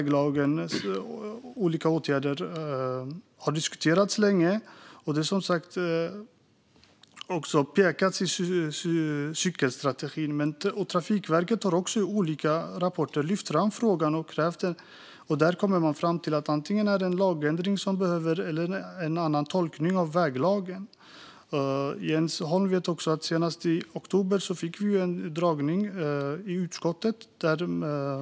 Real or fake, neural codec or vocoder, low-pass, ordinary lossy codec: real; none; none; none